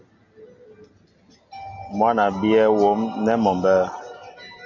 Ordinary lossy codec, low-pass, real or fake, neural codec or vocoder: MP3, 48 kbps; 7.2 kHz; real; none